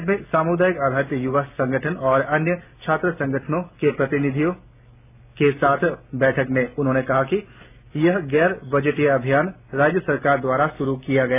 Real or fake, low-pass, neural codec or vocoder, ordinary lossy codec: real; 3.6 kHz; none; none